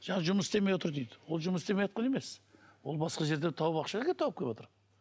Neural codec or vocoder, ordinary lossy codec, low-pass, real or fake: none; none; none; real